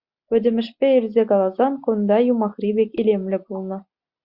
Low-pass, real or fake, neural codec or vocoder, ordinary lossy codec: 5.4 kHz; real; none; Opus, 64 kbps